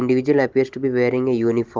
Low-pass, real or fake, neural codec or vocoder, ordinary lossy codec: 7.2 kHz; real; none; Opus, 32 kbps